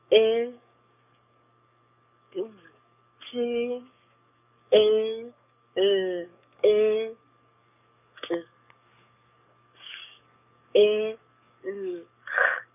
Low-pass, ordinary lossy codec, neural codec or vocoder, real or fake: 3.6 kHz; none; codec, 44.1 kHz, 7.8 kbps, DAC; fake